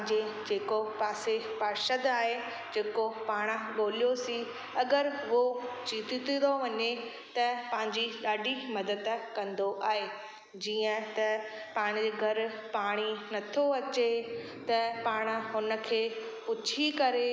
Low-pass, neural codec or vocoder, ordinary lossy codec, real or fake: none; none; none; real